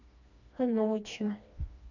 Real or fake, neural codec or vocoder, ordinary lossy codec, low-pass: fake; codec, 16 kHz, 2 kbps, FreqCodec, smaller model; none; 7.2 kHz